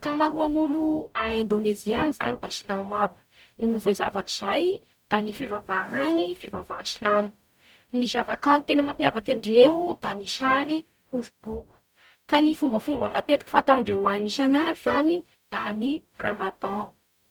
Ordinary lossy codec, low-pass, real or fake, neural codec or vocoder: none; none; fake; codec, 44.1 kHz, 0.9 kbps, DAC